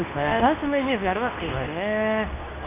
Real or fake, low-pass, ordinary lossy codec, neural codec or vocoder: fake; 3.6 kHz; none; codec, 24 kHz, 0.9 kbps, WavTokenizer, medium speech release version 2